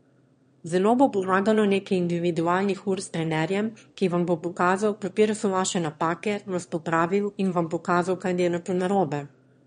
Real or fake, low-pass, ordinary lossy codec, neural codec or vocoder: fake; 9.9 kHz; MP3, 48 kbps; autoencoder, 22.05 kHz, a latent of 192 numbers a frame, VITS, trained on one speaker